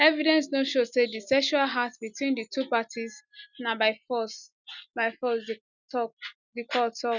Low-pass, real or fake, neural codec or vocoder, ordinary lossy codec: 7.2 kHz; real; none; none